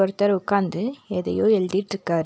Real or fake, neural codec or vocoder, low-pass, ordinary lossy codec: real; none; none; none